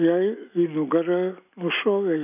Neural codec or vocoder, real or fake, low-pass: none; real; 3.6 kHz